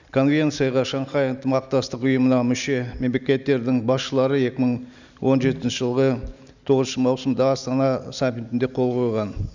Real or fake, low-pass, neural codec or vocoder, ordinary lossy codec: real; 7.2 kHz; none; none